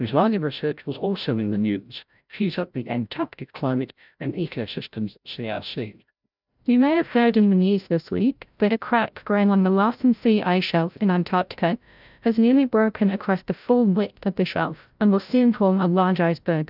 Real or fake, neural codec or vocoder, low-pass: fake; codec, 16 kHz, 0.5 kbps, FreqCodec, larger model; 5.4 kHz